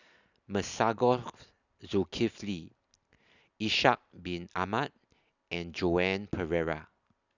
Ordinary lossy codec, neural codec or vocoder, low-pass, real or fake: none; none; 7.2 kHz; real